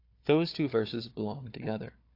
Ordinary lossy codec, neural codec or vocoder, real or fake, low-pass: AAC, 32 kbps; codec, 16 kHz, 4 kbps, FunCodec, trained on Chinese and English, 50 frames a second; fake; 5.4 kHz